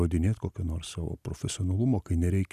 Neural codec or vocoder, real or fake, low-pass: none; real; 14.4 kHz